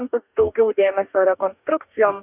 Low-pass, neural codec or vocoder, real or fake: 3.6 kHz; codec, 44.1 kHz, 2.6 kbps, DAC; fake